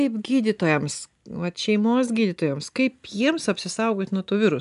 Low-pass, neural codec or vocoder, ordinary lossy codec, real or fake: 10.8 kHz; none; MP3, 96 kbps; real